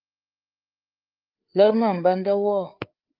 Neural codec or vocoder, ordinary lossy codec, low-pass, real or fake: codec, 16 kHz, 4 kbps, FreqCodec, larger model; Opus, 24 kbps; 5.4 kHz; fake